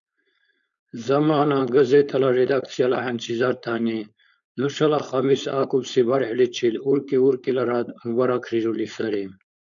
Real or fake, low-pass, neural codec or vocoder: fake; 7.2 kHz; codec, 16 kHz, 4.8 kbps, FACodec